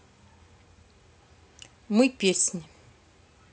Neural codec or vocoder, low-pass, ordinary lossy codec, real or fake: none; none; none; real